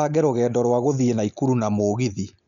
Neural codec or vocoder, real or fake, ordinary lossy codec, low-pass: none; real; none; 7.2 kHz